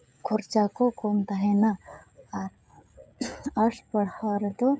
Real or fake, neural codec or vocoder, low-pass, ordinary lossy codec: fake; codec, 16 kHz, 16 kbps, FreqCodec, smaller model; none; none